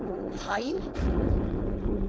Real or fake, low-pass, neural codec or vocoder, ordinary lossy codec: fake; none; codec, 16 kHz, 4.8 kbps, FACodec; none